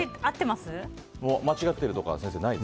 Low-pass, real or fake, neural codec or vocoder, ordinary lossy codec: none; real; none; none